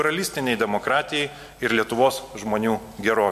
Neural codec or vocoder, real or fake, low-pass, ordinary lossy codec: none; real; 14.4 kHz; MP3, 64 kbps